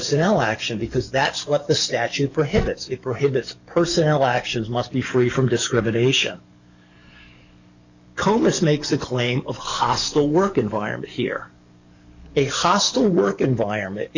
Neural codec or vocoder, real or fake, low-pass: codec, 44.1 kHz, 7.8 kbps, DAC; fake; 7.2 kHz